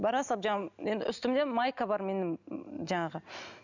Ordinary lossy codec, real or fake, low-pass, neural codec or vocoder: none; real; 7.2 kHz; none